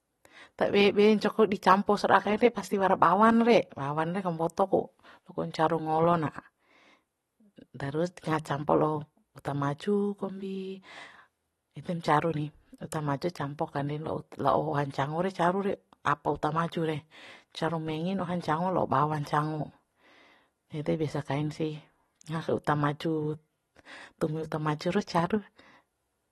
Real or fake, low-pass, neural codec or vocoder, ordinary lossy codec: real; 19.8 kHz; none; AAC, 32 kbps